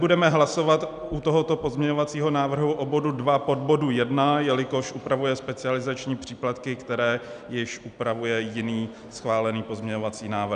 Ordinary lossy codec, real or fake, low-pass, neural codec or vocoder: MP3, 96 kbps; real; 9.9 kHz; none